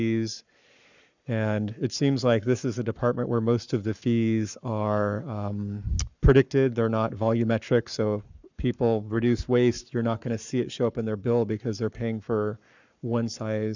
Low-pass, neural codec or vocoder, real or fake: 7.2 kHz; codec, 44.1 kHz, 7.8 kbps, Pupu-Codec; fake